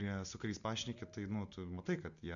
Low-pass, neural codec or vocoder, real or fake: 7.2 kHz; none; real